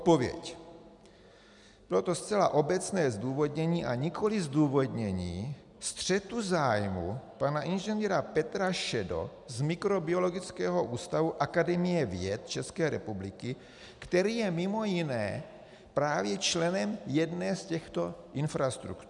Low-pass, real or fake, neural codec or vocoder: 10.8 kHz; real; none